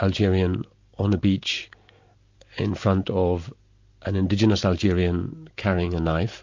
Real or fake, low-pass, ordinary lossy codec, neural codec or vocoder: real; 7.2 kHz; MP3, 48 kbps; none